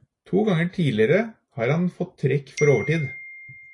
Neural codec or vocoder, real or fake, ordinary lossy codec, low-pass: none; real; AAC, 48 kbps; 10.8 kHz